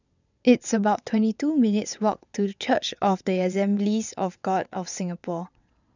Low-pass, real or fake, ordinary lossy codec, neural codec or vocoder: 7.2 kHz; fake; none; vocoder, 22.05 kHz, 80 mel bands, WaveNeXt